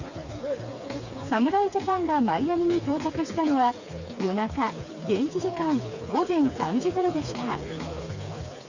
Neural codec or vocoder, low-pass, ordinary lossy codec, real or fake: codec, 16 kHz, 4 kbps, FreqCodec, smaller model; 7.2 kHz; Opus, 64 kbps; fake